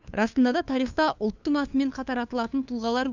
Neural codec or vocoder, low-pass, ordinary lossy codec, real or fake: codec, 16 kHz, 2 kbps, FunCodec, trained on LibriTTS, 25 frames a second; 7.2 kHz; none; fake